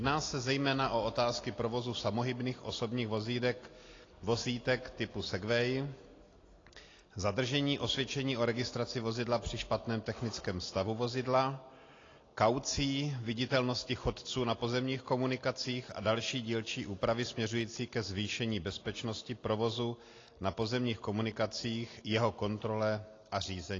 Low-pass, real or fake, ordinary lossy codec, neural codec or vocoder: 7.2 kHz; real; AAC, 32 kbps; none